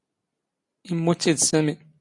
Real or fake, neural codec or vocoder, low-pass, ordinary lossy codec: real; none; 10.8 kHz; MP3, 48 kbps